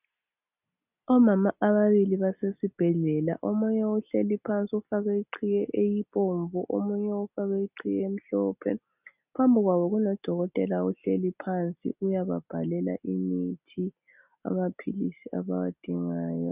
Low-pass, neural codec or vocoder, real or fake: 3.6 kHz; none; real